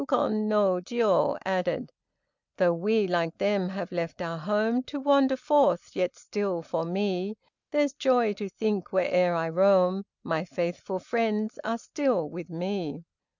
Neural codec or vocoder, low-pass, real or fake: none; 7.2 kHz; real